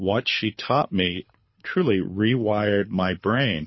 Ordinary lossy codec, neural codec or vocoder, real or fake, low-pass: MP3, 24 kbps; codec, 24 kHz, 6 kbps, HILCodec; fake; 7.2 kHz